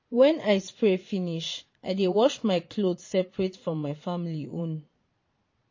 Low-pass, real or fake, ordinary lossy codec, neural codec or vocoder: 7.2 kHz; fake; MP3, 32 kbps; vocoder, 22.05 kHz, 80 mel bands, Vocos